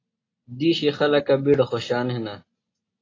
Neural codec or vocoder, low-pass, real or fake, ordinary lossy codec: none; 7.2 kHz; real; AAC, 32 kbps